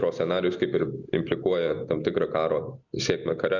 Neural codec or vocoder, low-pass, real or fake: none; 7.2 kHz; real